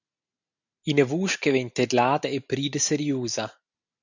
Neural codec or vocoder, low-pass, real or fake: none; 7.2 kHz; real